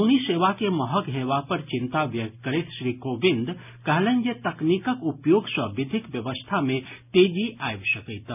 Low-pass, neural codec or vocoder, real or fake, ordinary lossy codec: 3.6 kHz; none; real; none